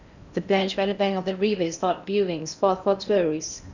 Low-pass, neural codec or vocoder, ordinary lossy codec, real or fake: 7.2 kHz; codec, 16 kHz in and 24 kHz out, 0.8 kbps, FocalCodec, streaming, 65536 codes; none; fake